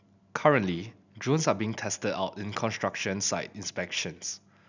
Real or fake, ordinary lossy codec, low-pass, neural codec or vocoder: real; none; 7.2 kHz; none